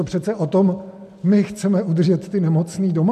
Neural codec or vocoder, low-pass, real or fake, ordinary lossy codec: none; 14.4 kHz; real; MP3, 64 kbps